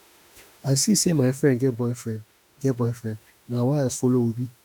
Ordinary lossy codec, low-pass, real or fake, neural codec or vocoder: none; none; fake; autoencoder, 48 kHz, 32 numbers a frame, DAC-VAE, trained on Japanese speech